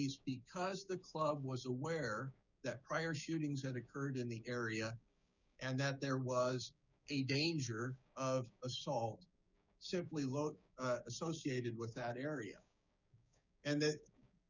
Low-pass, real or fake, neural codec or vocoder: 7.2 kHz; fake; codec, 16 kHz, 6 kbps, DAC